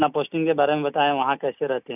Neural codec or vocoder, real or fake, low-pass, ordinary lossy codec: none; real; 3.6 kHz; none